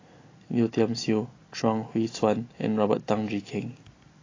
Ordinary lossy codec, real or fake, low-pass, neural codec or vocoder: AAC, 32 kbps; real; 7.2 kHz; none